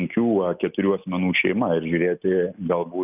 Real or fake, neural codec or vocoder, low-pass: real; none; 3.6 kHz